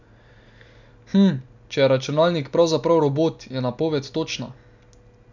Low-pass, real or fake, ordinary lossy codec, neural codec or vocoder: 7.2 kHz; real; none; none